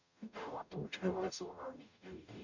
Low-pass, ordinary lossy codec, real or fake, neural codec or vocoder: 7.2 kHz; none; fake; codec, 44.1 kHz, 0.9 kbps, DAC